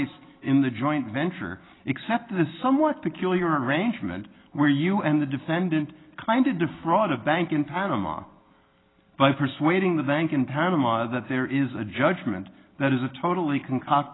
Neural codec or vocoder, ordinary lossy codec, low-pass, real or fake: none; AAC, 16 kbps; 7.2 kHz; real